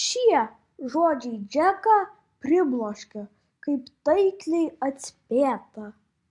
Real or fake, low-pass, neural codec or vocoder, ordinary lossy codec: real; 10.8 kHz; none; MP3, 64 kbps